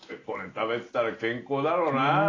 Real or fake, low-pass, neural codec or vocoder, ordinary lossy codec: real; 7.2 kHz; none; AAC, 32 kbps